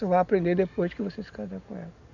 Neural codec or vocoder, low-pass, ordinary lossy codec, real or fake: none; 7.2 kHz; none; real